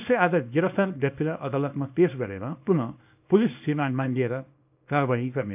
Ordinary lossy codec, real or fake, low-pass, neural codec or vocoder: AAC, 32 kbps; fake; 3.6 kHz; codec, 24 kHz, 0.9 kbps, WavTokenizer, small release